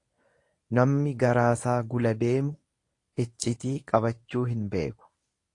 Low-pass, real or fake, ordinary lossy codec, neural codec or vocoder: 10.8 kHz; fake; AAC, 48 kbps; codec, 24 kHz, 0.9 kbps, WavTokenizer, medium speech release version 1